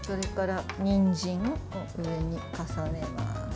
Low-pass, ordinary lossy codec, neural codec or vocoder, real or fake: none; none; none; real